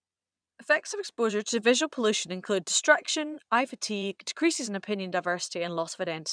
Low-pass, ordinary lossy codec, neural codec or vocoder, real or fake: 9.9 kHz; none; vocoder, 22.05 kHz, 80 mel bands, Vocos; fake